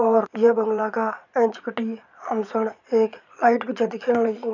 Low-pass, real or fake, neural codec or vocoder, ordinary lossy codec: 7.2 kHz; fake; vocoder, 22.05 kHz, 80 mel bands, Vocos; none